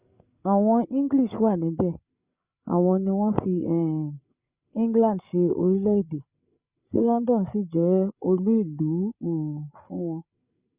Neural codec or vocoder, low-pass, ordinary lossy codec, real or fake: codec, 16 kHz, 8 kbps, FreqCodec, larger model; 3.6 kHz; Opus, 64 kbps; fake